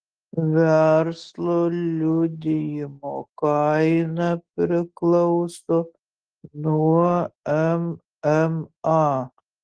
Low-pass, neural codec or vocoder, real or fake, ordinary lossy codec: 9.9 kHz; none; real; Opus, 16 kbps